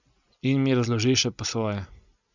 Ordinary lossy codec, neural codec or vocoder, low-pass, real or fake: none; none; 7.2 kHz; real